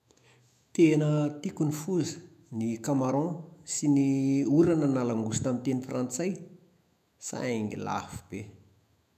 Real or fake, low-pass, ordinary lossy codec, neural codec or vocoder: fake; 14.4 kHz; none; autoencoder, 48 kHz, 128 numbers a frame, DAC-VAE, trained on Japanese speech